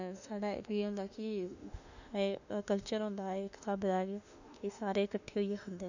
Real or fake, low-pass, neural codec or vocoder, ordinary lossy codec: fake; 7.2 kHz; autoencoder, 48 kHz, 32 numbers a frame, DAC-VAE, trained on Japanese speech; none